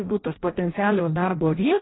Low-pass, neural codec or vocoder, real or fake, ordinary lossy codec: 7.2 kHz; codec, 16 kHz in and 24 kHz out, 0.6 kbps, FireRedTTS-2 codec; fake; AAC, 16 kbps